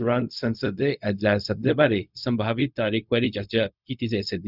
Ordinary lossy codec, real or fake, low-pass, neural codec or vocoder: none; fake; 5.4 kHz; codec, 16 kHz, 0.4 kbps, LongCat-Audio-Codec